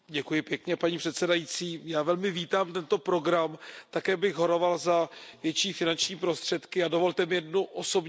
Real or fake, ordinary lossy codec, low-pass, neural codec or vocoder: real; none; none; none